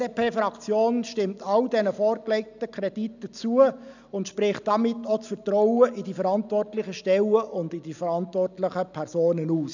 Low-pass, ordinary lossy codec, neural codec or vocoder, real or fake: 7.2 kHz; none; none; real